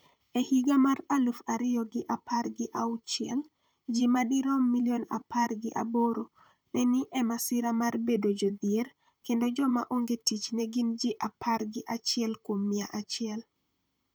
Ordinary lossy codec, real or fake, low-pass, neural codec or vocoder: none; fake; none; vocoder, 44.1 kHz, 128 mel bands, Pupu-Vocoder